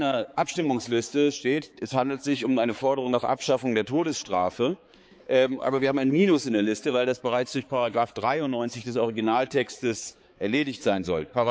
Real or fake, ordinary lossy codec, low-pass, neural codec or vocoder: fake; none; none; codec, 16 kHz, 4 kbps, X-Codec, HuBERT features, trained on balanced general audio